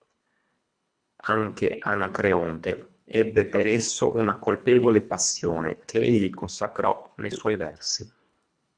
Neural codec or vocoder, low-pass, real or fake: codec, 24 kHz, 1.5 kbps, HILCodec; 9.9 kHz; fake